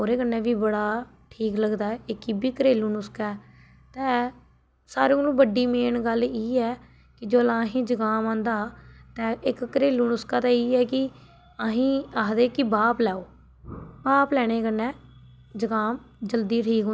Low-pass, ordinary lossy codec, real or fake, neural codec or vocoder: none; none; real; none